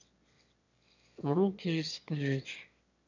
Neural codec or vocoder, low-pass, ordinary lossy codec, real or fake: autoencoder, 22.05 kHz, a latent of 192 numbers a frame, VITS, trained on one speaker; 7.2 kHz; none; fake